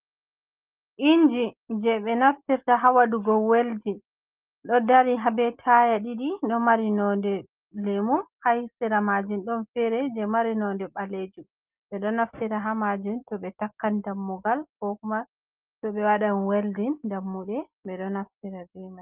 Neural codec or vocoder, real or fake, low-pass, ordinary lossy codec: none; real; 3.6 kHz; Opus, 32 kbps